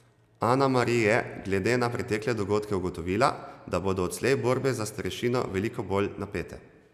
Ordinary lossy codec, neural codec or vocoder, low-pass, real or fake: none; none; 14.4 kHz; real